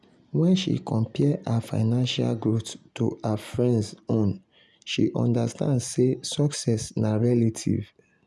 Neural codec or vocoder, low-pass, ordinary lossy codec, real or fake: none; none; none; real